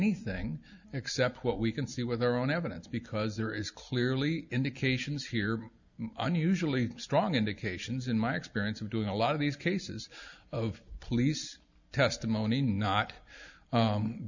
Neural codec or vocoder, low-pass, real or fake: none; 7.2 kHz; real